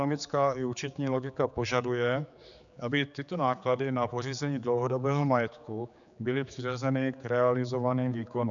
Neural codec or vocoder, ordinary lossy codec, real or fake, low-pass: codec, 16 kHz, 4 kbps, X-Codec, HuBERT features, trained on general audio; MP3, 96 kbps; fake; 7.2 kHz